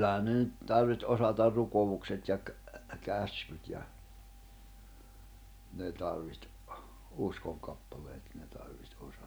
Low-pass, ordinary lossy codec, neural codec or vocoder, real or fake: none; none; none; real